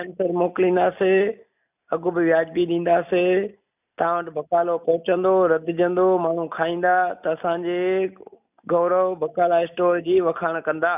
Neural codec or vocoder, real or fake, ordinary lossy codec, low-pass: none; real; none; 3.6 kHz